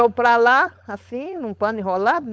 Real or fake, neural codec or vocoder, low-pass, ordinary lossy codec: fake; codec, 16 kHz, 4.8 kbps, FACodec; none; none